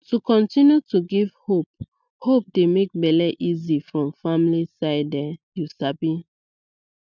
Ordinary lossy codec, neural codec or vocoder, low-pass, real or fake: none; none; 7.2 kHz; real